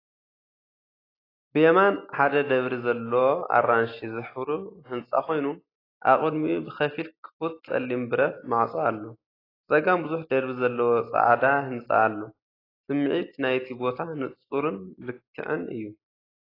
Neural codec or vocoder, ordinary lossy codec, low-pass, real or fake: none; AAC, 24 kbps; 5.4 kHz; real